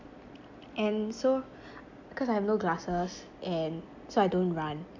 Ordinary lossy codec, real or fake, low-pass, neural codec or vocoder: MP3, 64 kbps; real; 7.2 kHz; none